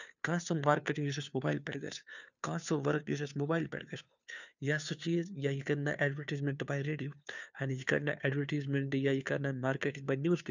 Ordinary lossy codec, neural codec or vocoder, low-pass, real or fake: none; codec, 16 kHz, 2 kbps, FunCodec, trained on Chinese and English, 25 frames a second; 7.2 kHz; fake